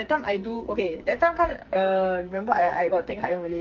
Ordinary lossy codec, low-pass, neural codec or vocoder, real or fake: Opus, 24 kbps; 7.2 kHz; codec, 44.1 kHz, 2.6 kbps, SNAC; fake